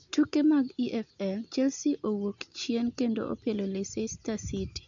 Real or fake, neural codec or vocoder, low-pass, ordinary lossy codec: real; none; 7.2 kHz; MP3, 96 kbps